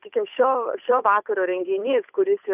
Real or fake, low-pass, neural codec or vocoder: fake; 3.6 kHz; codec, 16 kHz, 8 kbps, FunCodec, trained on Chinese and English, 25 frames a second